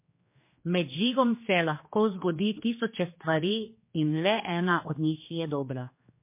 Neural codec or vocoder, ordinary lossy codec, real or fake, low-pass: codec, 16 kHz, 2 kbps, X-Codec, HuBERT features, trained on general audio; MP3, 24 kbps; fake; 3.6 kHz